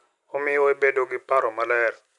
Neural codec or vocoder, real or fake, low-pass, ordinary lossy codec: none; real; 10.8 kHz; none